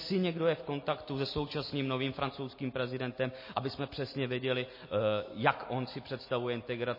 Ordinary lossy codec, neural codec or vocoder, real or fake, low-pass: MP3, 24 kbps; none; real; 5.4 kHz